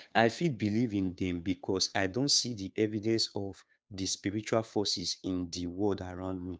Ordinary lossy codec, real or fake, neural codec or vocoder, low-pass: none; fake; codec, 16 kHz, 2 kbps, FunCodec, trained on Chinese and English, 25 frames a second; none